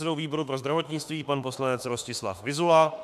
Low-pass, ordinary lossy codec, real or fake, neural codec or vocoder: 14.4 kHz; MP3, 96 kbps; fake; autoencoder, 48 kHz, 32 numbers a frame, DAC-VAE, trained on Japanese speech